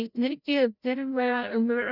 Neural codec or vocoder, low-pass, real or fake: codec, 16 kHz, 0.5 kbps, FreqCodec, larger model; 5.4 kHz; fake